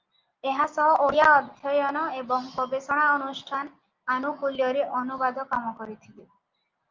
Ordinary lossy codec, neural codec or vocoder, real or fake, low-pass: Opus, 24 kbps; none; real; 7.2 kHz